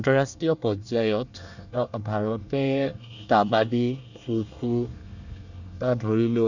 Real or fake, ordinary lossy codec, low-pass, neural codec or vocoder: fake; none; 7.2 kHz; codec, 24 kHz, 1 kbps, SNAC